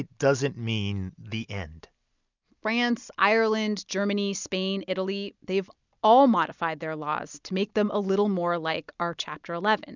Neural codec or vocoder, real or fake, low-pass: none; real; 7.2 kHz